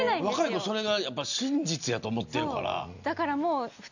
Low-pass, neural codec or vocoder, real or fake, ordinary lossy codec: 7.2 kHz; none; real; none